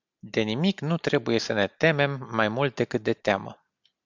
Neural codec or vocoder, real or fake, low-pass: none; real; 7.2 kHz